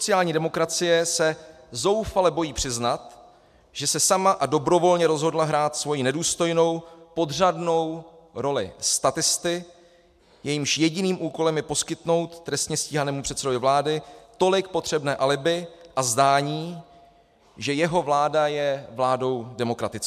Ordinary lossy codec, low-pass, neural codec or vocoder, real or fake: MP3, 96 kbps; 14.4 kHz; none; real